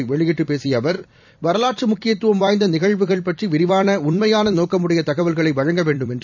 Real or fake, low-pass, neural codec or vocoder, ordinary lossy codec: fake; 7.2 kHz; vocoder, 44.1 kHz, 128 mel bands every 256 samples, BigVGAN v2; none